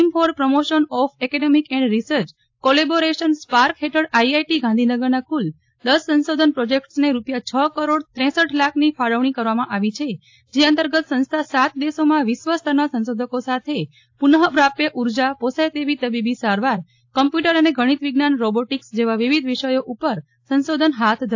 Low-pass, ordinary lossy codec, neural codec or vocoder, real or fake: 7.2 kHz; AAC, 48 kbps; none; real